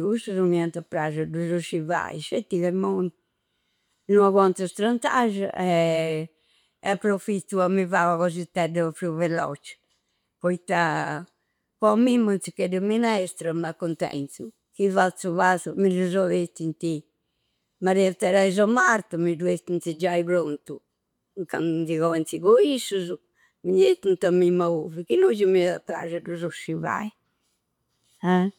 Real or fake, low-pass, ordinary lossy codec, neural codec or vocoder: real; 19.8 kHz; none; none